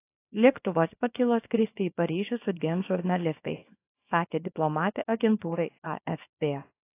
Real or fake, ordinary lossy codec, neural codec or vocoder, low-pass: fake; AAC, 24 kbps; codec, 24 kHz, 0.9 kbps, WavTokenizer, small release; 3.6 kHz